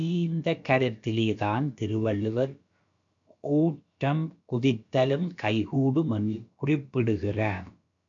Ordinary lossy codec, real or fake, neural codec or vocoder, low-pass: AAC, 64 kbps; fake; codec, 16 kHz, about 1 kbps, DyCAST, with the encoder's durations; 7.2 kHz